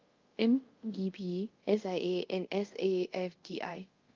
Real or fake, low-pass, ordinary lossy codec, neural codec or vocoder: fake; 7.2 kHz; Opus, 32 kbps; codec, 24 kHz, 0.5 kbps, DualCodec